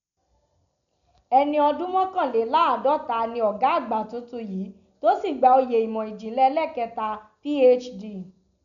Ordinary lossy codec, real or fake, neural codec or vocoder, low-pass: none; real; none; 7.2 kHz